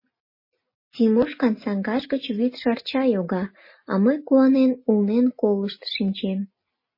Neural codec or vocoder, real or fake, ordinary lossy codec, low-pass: none; real; MP3, 24 kbps; 5.4 kHz